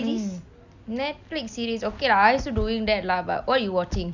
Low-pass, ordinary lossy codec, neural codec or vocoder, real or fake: 7.2 kHz; none; none; real